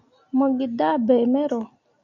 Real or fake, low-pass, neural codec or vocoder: real; 7.2 kHz; none